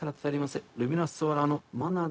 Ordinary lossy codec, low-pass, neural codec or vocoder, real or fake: none; none; codec, 16 kHz, 0.4 kbps, LongCat-Audio-Codec; fake